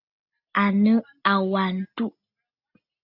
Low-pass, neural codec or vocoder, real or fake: 5.4 kHz; none; real